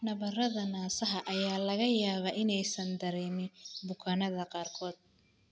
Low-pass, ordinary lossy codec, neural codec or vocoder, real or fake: none; none; none; real